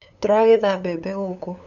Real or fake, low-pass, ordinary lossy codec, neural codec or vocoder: fake; 7.2 kHz; none; codec, 16 kHz, 8 kbps, FunCodec, trained on LibriTTS, 25 frames a second